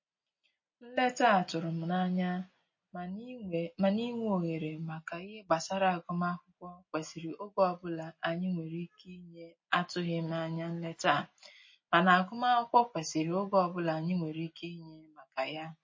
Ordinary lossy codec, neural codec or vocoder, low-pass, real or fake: MP3, 32 kbps; none; 7.2 kHz; real